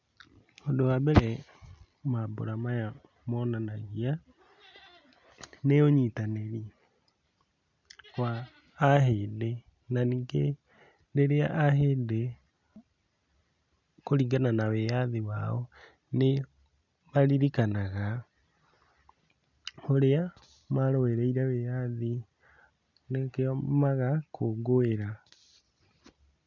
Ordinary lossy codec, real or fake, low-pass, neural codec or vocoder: none; real; 7.2 kHz; none